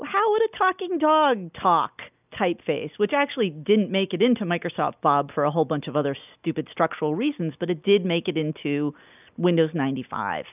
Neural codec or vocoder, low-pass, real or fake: none; 3.6 kHz; real